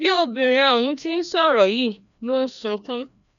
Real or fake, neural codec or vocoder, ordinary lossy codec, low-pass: fake; codec, 16 kHz, 1 kbps, FreqCodec, larger model; none; 7.2 kHz